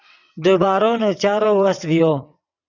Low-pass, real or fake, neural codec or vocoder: 7.2 kHz; fake; vocoder, 22.05 kHz, 80 mel bands, WaveNeXt